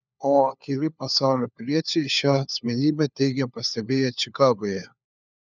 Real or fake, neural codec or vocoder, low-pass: fake; codec, 16 kHz, 4 kbps, FunCodec, trained on LibriTTS, 50 frames a second; 7.2 kHz